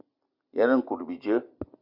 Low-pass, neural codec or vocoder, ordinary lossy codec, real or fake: 5.4 kHz; none; Opus, 64 kbps; real